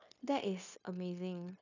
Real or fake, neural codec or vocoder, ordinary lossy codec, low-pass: fake; codec, 16 kHz, 0.9 kbps, LongCat-Audio-Codec; none; 7.2 kHz